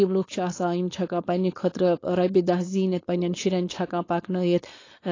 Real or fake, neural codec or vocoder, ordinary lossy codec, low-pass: fake; codec, 16 kHz, 4.8 kbps, FACodec; AAC, 32 kbps; 7.2 kHz